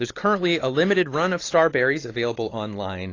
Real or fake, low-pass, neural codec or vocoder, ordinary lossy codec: real; 7.2 kHz; none; AAC, 32 kbps